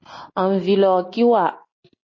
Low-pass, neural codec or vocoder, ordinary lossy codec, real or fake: 7.2 kHz; none; MP3, 32 kbps; real